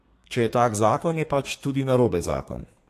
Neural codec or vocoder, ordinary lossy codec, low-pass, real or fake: codec, 32 kHz, 1.9 kbps, SNAC; AAC, 64 kbps; 14.4 kHz; fake